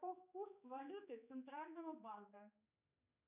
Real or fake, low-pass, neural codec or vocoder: fake; 3.6 kHz; codec, 16 kHz, 4 kbps, X-Codec, HuBERT features, trained on general audio